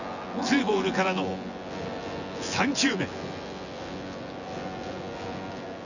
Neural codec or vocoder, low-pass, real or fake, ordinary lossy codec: vocoder, 24 kHz, 100 mel bands, Vocos; 7.2 kHz; fake; none